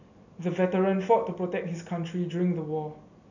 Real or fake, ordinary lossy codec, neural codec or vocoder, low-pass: real; none; none; 7.2 kHz